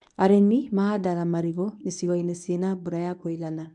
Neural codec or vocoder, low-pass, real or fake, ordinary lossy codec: codec, 24 kHz, 0.9 kbps, WavTokenizer, medium speech release version 1; 10.8 kHz; fake; none